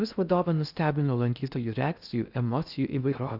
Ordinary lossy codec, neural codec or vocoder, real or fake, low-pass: Opus, 64 kbps; codec, 16 kHz in and 24 kHz out, 0.6 kbps, FocalCodec, streaming, 4096 codes; fake; 5.4 kHz